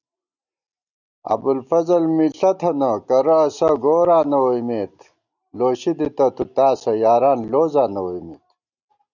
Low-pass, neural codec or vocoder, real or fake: 7.2 kHz; none; real